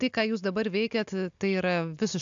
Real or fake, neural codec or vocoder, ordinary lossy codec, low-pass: real; none; AAC, 64 kbps; 7.2 kHz